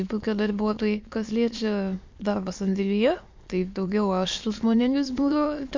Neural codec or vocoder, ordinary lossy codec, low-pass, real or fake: autoencoder, 22.05 kHz, a latent of 192 numbers a frame, VITS, trained on many speakers; MP3, 48 kbps; 7.2 kHz; fake